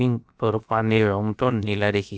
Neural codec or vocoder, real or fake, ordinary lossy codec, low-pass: codec, 16 kHz, about 1 kbps, DyCAST, with the encoder's durations; fake; none; none